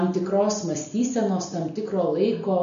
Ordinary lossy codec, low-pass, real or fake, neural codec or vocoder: MP3, 96 kbps; 7.2 kHz; real; none